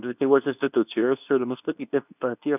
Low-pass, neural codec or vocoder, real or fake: 3.6 kHz; codec, 24 kHz, 0.9 kbps, WavTokenizer, medium speech release version 2; fake